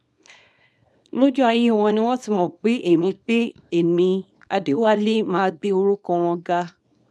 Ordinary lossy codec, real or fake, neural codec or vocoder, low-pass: none; fake; codec, 24 kHz, 0.9 kbps, WavTokenizer, small release; none